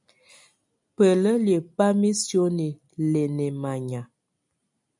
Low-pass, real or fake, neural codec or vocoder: 10.8 kHz; real; none